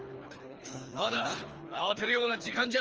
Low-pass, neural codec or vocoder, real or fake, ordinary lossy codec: 7.2 kHz; codec, 24 kHz, 3 kbps, HILCodec; fake; Opus, 24 kbps